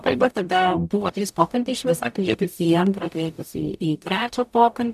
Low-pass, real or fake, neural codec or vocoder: 14.4 kHz; fake; codec, 44.1 kHz, 0.9 kbps, DAC